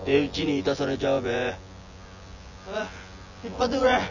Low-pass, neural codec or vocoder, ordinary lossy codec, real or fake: 7.2 kHz; vocoder, 24 kHz, 100 mel bands, Vocos; none; fake